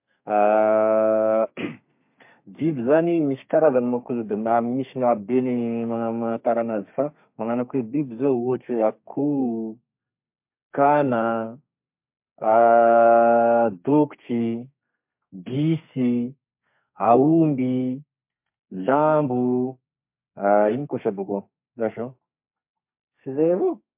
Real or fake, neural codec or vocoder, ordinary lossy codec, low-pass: fake; codec, 32 kHz, 1.9 kbps, SNAC; MP3, 32 kbps; 3.6 kHz